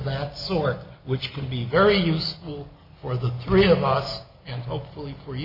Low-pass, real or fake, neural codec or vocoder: 5.4 kHz; real; none